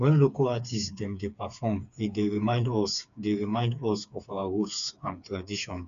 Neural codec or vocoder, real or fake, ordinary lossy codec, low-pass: codec, 16 kHz, 4 kbps, FreqCodec, smaller model; fake; MP3, 96 kbps; 7.2 kHz